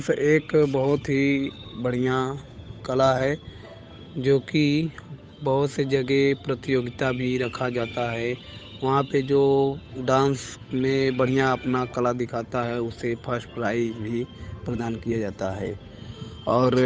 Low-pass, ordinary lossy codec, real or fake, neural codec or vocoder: none; none; fake; codec, 16 kHz, 8 kbps, FunCodec, trained on Chinese and English, 25 frames a second